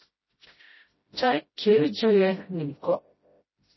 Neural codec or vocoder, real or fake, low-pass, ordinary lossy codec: codec, 16 kHz, 0.5 kbps, FreqCodec, smaller model; fake; 7.2 kHz; MP3, 24 kbps